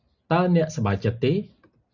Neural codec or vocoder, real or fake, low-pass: none; real; 7.2 kHz